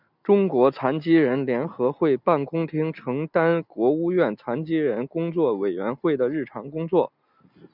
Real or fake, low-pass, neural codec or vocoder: real; 5.4 kHz; none